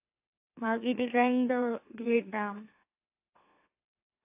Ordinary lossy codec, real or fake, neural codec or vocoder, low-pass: AAC, 24 kbps; fake; autoencoder, 44.1 kHz, a latent of 192 numbers a frame, MeloTTS; 3.6 kHz